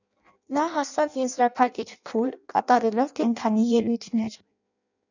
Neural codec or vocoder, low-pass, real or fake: codec, 16 kHz in and 24 kHz out, 0.6 kbps, FireRedTTS-2 codec; 7.2 kHz; fake